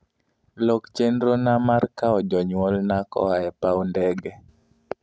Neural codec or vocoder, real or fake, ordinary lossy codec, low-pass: none; real; none; none